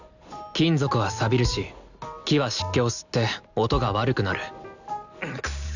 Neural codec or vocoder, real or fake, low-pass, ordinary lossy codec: none; real; 7.2 kHz; none